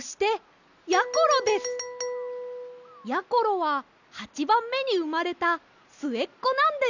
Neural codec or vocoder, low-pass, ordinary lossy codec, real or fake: none; 7.2 kHz; none; real